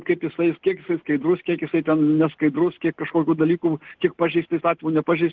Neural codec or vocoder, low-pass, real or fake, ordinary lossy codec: none; 7.2 kHz; real; Opus, 16 kbps